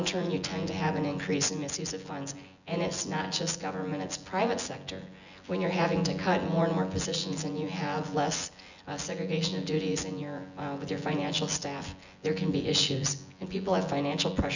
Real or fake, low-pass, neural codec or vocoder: fake; 7.2 kHz; vocoder, 24 kHz, 100 mel bands, Vocos